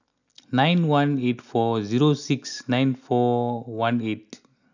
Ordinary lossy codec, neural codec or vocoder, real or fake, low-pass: none; none; real; 7.2 kHz